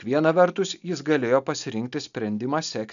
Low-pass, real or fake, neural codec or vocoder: 7.2 kHz; real; none